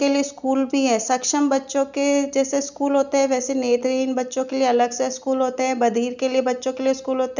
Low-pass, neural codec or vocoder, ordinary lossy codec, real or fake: 7.2 kHz; none; none; real